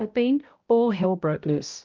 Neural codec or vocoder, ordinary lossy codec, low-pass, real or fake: codec, 16 kHz, 0.5 kbps, X-Codec, HuBERT features, trained on balanced general audio; Opus, 24 kbps; 7.2 kHz; fake